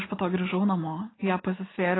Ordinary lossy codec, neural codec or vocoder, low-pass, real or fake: AAC, 16 kbps; none; 7.2 kHz; real